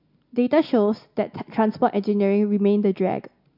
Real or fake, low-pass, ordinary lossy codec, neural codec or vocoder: real; 5.4 kHz; MP3, 48 kbps; none